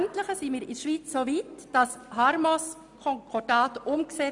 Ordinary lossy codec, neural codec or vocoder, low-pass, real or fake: none; none; 10.8 kHz; real